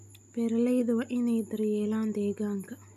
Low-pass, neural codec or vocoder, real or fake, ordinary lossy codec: 14.4 kHz; none; real; none